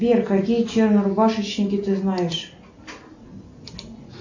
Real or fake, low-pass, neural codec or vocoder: real; 7.2 kHz; none